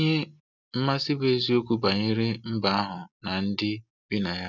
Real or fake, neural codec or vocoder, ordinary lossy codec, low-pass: real; none; none; 7.2 kHz